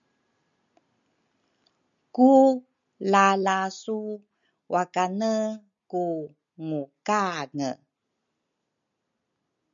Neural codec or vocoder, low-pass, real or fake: none; 7.2 kHz; real